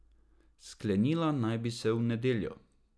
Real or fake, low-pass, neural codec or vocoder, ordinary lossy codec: real; none; none; none